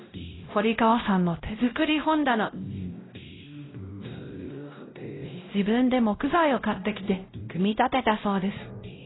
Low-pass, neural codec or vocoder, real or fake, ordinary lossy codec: 7.2 kHz; codec, 16 kHz, 0.5 kbps, X-Codec, WavLM features, trained on Multilingual LibriSpeech; fake; AAC, 16 kbps